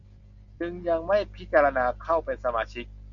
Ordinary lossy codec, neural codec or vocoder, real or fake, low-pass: MP3, 48 kbps; none; real; 7.2 kHz